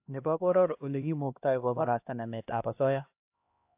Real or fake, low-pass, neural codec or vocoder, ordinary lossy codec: fake; 3.6 kHz; codec, 16 kHz, 1 kbps, X-Codec, HuBERT features, trained on LibriSpeech; AAC, 32 kbps